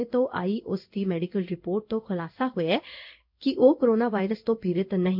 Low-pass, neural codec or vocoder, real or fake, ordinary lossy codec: 5.4 kHz; codec, 16 kHz in and 24 kHz out, 1 kbps, XY-Tokenizer; fake; MP3, 48 kbps